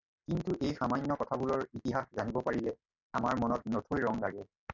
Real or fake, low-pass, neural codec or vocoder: real; 7.2 kHz; none